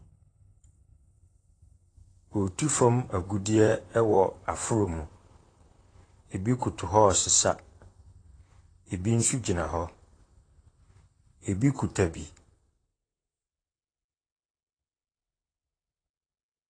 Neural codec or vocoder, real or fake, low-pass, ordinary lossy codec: vocoder, 44.1 kHz, 128 mel bands, Pupu-Vocoder; fake; 9.9 kHz; AAC, 32 kbps